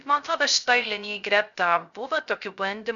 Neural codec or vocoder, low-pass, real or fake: codec, 16 kHz, 0.3 kbps, FocalCodec; 7.2 kHz; fake